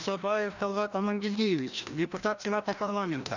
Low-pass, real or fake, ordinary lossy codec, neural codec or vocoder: 7.2 kHz; fake; none; codec, 16 kHz, 1 kbps, FreqCodec, larger model